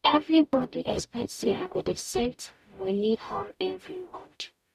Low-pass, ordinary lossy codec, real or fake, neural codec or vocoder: 14.4 kHz; none; fake; codec, 44.1 kHz, 0.9 kbps, DAC